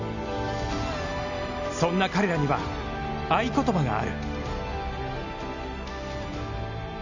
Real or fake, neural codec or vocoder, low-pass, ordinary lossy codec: real; none; 7.2 kHz; none